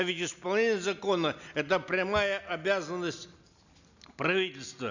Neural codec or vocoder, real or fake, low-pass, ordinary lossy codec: none; real; 7.2 kHz; none